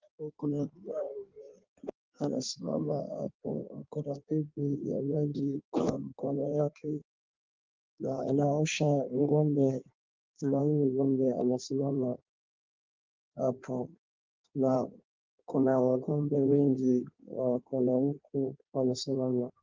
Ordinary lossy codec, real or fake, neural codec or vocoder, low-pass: Opus, 32 kbps; fake; codec, 16 kHz in and 24 kHz out, 1.1 kbps, FireRedTTS-2 codec; 7.2 kHz